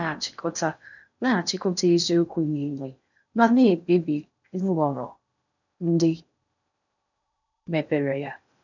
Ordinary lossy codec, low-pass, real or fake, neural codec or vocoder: none; 7.2 kHz; fake; codec, 16 kHz in and 24 kHz out, 0.6 kbps, FocalCodec, streaming, 4096 codes